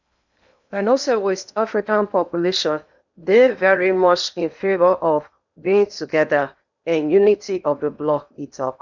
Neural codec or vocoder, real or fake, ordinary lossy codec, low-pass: codec, 16 kHz in and 24 kHz out, 0.8 kbps, FocalCodec, streaming, 65536 codes; fake; none; 7.2 kHz